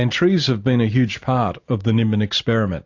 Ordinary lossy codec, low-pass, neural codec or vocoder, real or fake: AAC, 48 kbps; 7.2 kHz; none; real